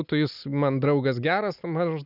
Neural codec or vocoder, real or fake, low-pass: none; real; 5.4 kHz